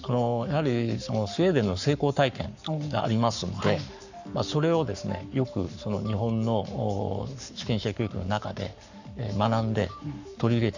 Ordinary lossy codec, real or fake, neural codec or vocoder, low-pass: none; fake; codec, 44.1 kHz, 7.8 kbps, Pupu-Codec; 7.2 kHz